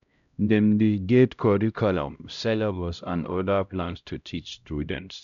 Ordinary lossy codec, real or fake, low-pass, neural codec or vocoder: none; fake; 7.2 kHz; codec, 16 kHz, 0.5 kbps, X-Codec, HuBERT features, trained on LibriSpeech